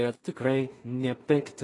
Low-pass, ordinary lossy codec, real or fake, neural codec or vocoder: 10.8 kHz; AAC, 32 kbps; fake; codec, 16 kHz in and 24 kHz out, 0.4 kbps, LongCat-Audio-Codec, two codebook decoder